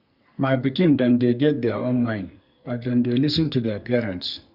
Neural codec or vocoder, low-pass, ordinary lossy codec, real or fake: codec, 44.1 kHz, 2.6 kbps, SNAC; 5.4 kHz; Opus, 64 kbps; fake